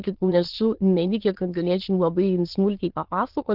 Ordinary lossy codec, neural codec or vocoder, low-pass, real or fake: Opus, 16 kbps; autoencoder, 22.05 kHz, a latent of 192 numbers a frame, VITS, trained on many speakers; 5.4 kHz; fake